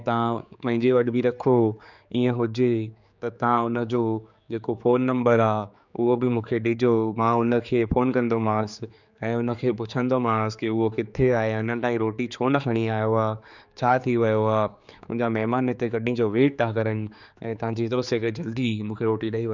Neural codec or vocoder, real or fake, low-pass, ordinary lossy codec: codec, 16 kHz, 4 kbps, X-Codec, HuBERT features, trained on general audio; fake; 7.2 kHz; none